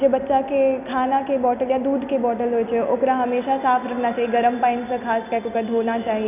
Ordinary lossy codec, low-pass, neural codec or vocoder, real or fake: none; 3.6 kHz; none; real